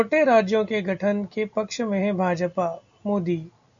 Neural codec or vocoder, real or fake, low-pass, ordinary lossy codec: none; real; 7.2 kHz; MP3, 96 kbps